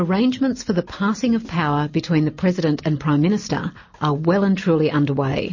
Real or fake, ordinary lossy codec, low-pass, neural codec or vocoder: real; MP3, 32 kbps; 7.2 kHz; none